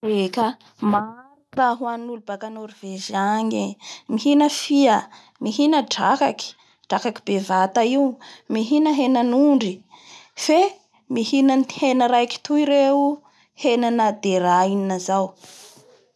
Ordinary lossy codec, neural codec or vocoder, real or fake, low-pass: none; none; real; none